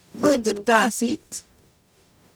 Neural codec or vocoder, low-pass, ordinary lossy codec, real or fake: codec, 44.1 kHz, 0.9 kbps, DAC; none; none; fake